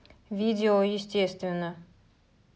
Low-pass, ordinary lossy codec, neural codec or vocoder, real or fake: none; none; none; real